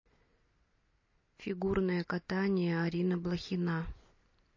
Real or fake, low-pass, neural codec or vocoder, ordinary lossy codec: real; 7.2 kHz; none; MP3, 32 kbps